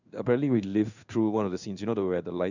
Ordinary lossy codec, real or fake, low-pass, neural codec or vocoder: none; fake; 7.2 kHz; codec, 16 kHz in and 24 kHz out, 1 kbps, XY-Tokenizer